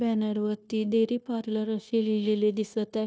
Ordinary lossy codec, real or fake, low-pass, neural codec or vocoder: none; fake; none; codec, 16 kHz, 0.9 kbps, LongCat-Audio-Codec